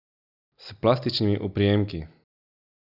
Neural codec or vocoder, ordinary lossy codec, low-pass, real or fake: none; none; 5.4 kHz; real